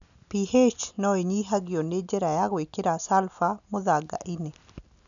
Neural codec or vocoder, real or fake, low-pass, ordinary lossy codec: none; real; 7.2 kHz; none